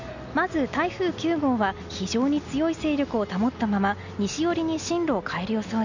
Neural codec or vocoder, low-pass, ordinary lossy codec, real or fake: none; 7.2 kHz; none; real